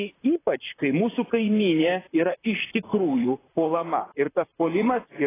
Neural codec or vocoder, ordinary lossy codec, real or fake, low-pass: vocoder, 44.1 kHz, 128 mel bands, Pupu-Vocoder; AAC, 16 kbps; fake; 3.6 kHz